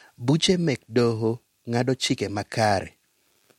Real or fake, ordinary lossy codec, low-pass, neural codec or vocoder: real; MP3, 64 kbps; 19.8 kHz; none